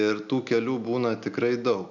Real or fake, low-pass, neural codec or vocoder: real; 7.2 kHz; none